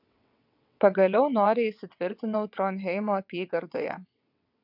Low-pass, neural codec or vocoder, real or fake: 5.4 kHz; vocoder, 22.05 kHz, 80 mel bands, WaveNeXt; fake